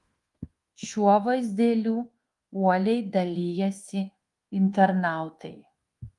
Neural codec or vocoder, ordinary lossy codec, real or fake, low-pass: codec, 24 kHz, 1.2 kbps, DualCodec; Opus, 24 kbps; fake; 10.8 kHz